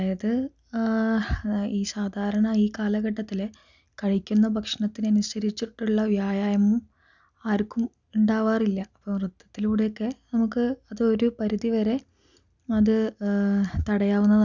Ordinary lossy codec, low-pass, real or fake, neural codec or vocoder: none; 7.2 kHz; real; none